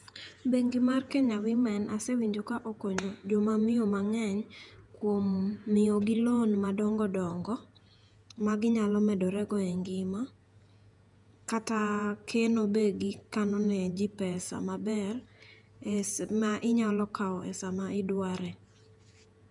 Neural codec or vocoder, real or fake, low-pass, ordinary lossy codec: vocoder, 48 kHz, 128 mel bands, Vocos; fake; 10.8 kHz; none